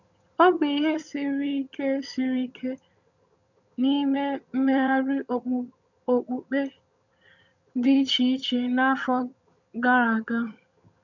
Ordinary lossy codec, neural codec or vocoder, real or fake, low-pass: none; vocoder, 22.05 kHz, 80 mel bands, HiFi-GAN; fake; 7.2 kHz